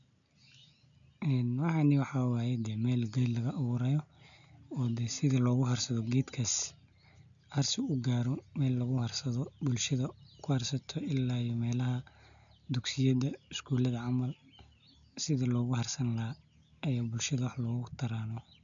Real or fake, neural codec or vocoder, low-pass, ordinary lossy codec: real; none; 7.2 kHz; none